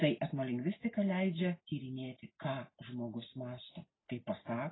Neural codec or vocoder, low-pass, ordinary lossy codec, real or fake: none; 7.2 kHz; AAC, 16 kbps; real